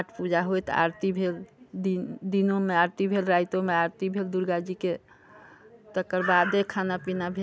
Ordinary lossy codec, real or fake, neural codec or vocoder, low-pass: none; real; none; none